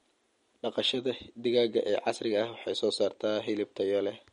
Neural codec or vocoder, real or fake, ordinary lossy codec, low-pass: none; real; MP3, 48 kbps; 19.8 kHz